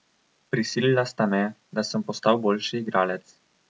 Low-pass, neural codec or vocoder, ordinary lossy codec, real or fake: none; none; none; real